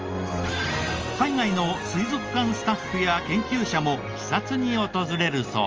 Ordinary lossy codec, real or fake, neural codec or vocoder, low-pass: Opus, 24 kbps; real; none; 7.2 kHz